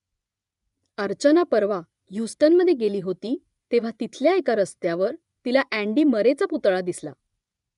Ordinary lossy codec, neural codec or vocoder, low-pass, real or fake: none; vocoder, 24 kHz, 100 mel bands, Vocos; 10.8 kHz; fake